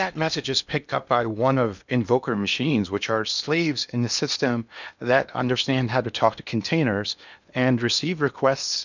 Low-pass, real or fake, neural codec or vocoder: 7.2 kHz; fake; codec, 16 kHz in and 24 kHz out, 0.8 kbps, FocalCodec, streaming, 65536 codes